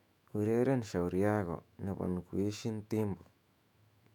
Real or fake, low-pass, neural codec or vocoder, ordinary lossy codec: fake; 19.8 kHz; autoencoder, 48 kHz, 128 numbers a frame, DAC-VAE, trained on Japanese speech; none